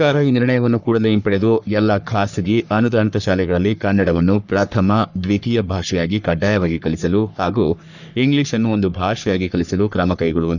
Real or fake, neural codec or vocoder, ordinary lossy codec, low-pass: fake; codec, 44.1 kHz, 3.4 kbps, Pupu-Codec; none; 7.2 kHz